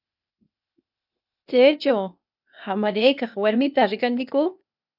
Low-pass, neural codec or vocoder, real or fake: 5.4 kHz; codec, 16 kHz, 0.8 kbps, ZipCodec; fake